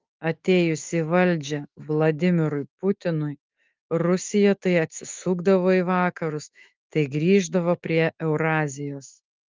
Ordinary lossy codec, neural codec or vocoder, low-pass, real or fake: Opus, 32 kbps; autoencoder, 48 kHz, 128 numbers a frame, DAC-VAE, trained on Japanese speech; 7.2 kHz; fake